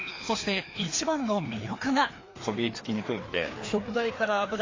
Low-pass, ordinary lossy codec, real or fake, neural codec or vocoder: 7.2 kHz; AAC, 32 kbps; fake; codec, 16 kHz, 2 kbps, FreqCodec, larger model